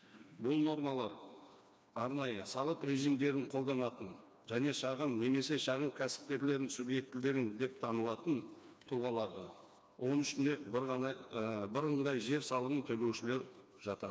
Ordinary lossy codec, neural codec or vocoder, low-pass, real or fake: none; codec, 16 kHz, 2 kbps, FreqCodec, smaller model; none; fake